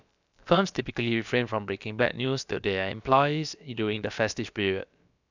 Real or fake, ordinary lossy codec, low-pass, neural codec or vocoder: fake; none; 7.2 kHz; codec, 16 kHz, about 1 kbps, DyCAST, with the encoder's durations